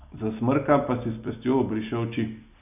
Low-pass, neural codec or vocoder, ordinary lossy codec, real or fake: 3.6 kHz; none; none; real